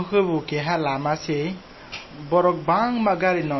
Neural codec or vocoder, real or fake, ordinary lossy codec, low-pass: none; real; MP3, 24 kbps; 7.2 kHz